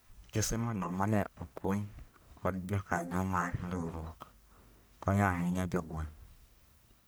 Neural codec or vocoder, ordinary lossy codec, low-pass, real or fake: codec, 44.1 kHz, 1.7 kbps, Pupu-Codec; none; none; fake